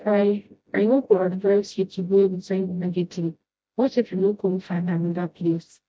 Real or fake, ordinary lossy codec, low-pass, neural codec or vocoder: fake; none; none; codec, 16 kHz, 0.5 kbps, FreqCodec, smaller model